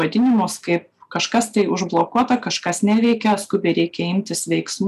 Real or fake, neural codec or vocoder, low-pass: real; none; 14.4 kHz